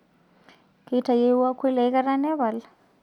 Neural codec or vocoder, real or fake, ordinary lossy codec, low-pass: none; real; none; 19.8 kHz